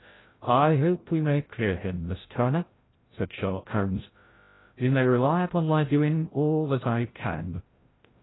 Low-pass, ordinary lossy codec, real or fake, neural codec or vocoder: 7.2 kHz; AAC, 16 kbps; fake; codec, 16 kHz, 0.5 kbps, FreqCodec, larger model